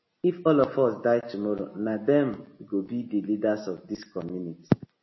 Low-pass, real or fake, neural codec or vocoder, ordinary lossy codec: 7.2 kHz; real; none; MP3, 24 kbps